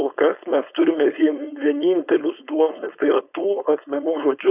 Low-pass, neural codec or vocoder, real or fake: 3.6 kHz; codec, 16 kHz, 4.8 kbps, FACodec; fake